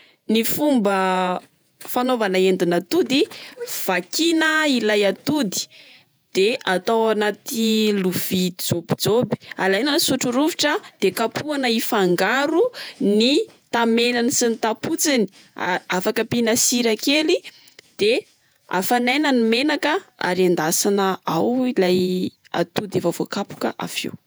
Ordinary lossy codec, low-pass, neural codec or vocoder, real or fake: none; none; vocoder, 48 kHz, 128 mel bands, Vocos; fake